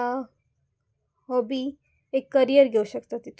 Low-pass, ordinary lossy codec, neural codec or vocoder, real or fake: none; none; none; real